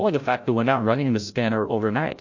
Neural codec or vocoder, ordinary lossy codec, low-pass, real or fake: codec, 16 kHz, 0.5 kbps, FreqCodec, larger model; MP3, 48 kbps; 7.2 kHz; fake